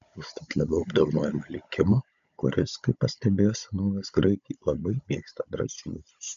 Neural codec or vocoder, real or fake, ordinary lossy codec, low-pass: codec, 16 kHz, 16 kbps, FunCodec, trained on Chinese and English, 50 frames a second; fake; MP3, 64 kbps; 7.2 kHz